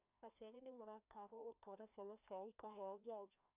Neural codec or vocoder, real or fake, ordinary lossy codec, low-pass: codec, 16 kHz, 1 kbps, FreqCodec, larger model; fake; none; 3.6 kHz